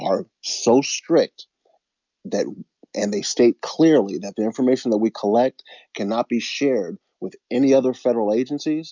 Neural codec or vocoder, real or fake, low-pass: none; real; 7.2 kHz